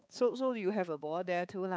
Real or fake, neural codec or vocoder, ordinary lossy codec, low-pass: fake; codec, 16 kHz, 4 kbps, X-Codec, HuBERT features, trained on balanced general audio; none; none